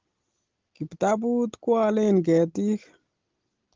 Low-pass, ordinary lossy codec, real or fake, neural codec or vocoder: 7.2 kHz; Opus, 16 kbps; real; none